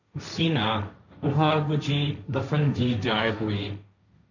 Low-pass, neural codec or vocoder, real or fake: 7.2 kHz; codec, 16 kHz, 1.1 kbps, Voila-Tokenizer; fake